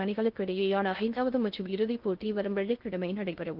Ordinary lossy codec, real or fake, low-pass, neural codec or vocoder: Opus, 32 kbps; fake; 5.4 kHz; codec, 16 kHz in and 24 kHz out, 0.6 kbps, FocalCodec, streaming, 2048 codes